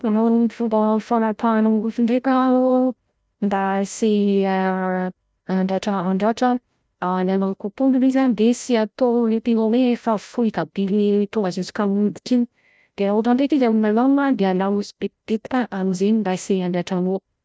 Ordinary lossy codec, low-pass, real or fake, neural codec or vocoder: none; none; fake; codec, 16 kHz, 0.5 kbps, FreqCodec, larger model